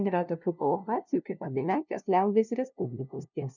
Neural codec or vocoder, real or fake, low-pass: codec, 16 kHz, 0.5 kbps, FunCodec, trained on LibriTTS, 25 frames a second; fake; 7.2 kHz